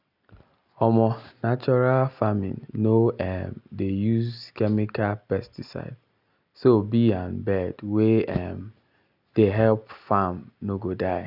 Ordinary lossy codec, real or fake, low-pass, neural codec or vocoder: none; real; 5.4 kHz; none